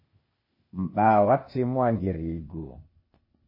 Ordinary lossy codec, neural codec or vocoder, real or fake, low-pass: MP3, 24 kbps; codec, 16 kHz, 0.8 kbps, ZipCodec; fake; 5.4 kHz